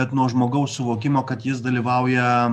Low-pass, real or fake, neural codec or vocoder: 14.4 kHz; real; none